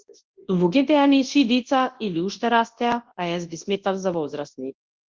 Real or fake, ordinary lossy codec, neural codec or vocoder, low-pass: fake; Opus, 16 kbps; codec, 24 kHz, 0.9 kbps, WavTokenizer, large speech release; 7.2 kHz